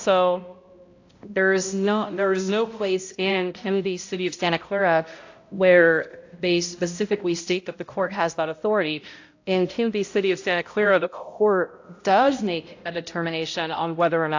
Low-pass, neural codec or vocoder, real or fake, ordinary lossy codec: 7.2 kHz; codec, 16 kHz, 0.5 kbps, X-Codec, HuBERT features, trained on balanced general audio; fake; AAC, 48 kbps